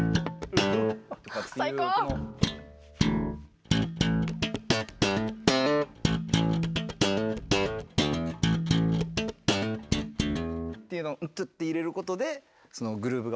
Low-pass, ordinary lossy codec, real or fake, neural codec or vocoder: none; none; real; none